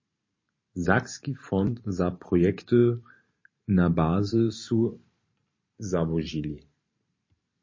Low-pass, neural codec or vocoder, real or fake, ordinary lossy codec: 7.2 kHz; vocoder, 44.1 kHz, 128 mel bands every 512 samples, BigVGAN v2; fake; MP3, 32 kbps